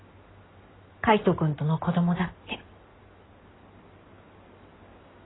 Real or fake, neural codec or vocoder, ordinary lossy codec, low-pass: real; none; AAC, 16 kbps; 7.2 kHz